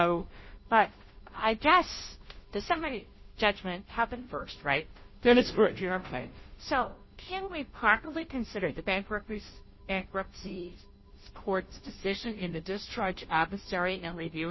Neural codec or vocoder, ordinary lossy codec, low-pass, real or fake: codec, 16 kHz, 0.5 kbps, FunCodec, trained on Chinese and English, 25 frames a second; MP3, 24 kbps; 7.2 kHz; fake